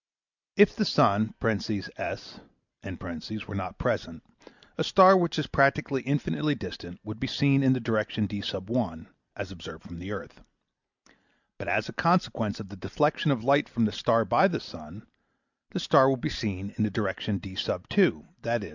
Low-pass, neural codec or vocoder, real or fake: 7.2 kHz; none; real